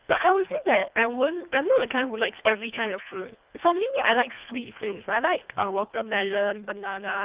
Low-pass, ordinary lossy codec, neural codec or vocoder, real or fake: 3.6 kHz; Opus, 24 kbps; codec, 24 kHz, 1.5 kbps, HILCodec; fake